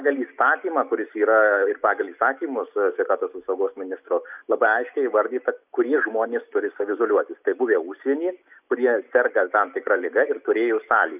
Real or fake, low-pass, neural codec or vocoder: real; 3.6 kHz; none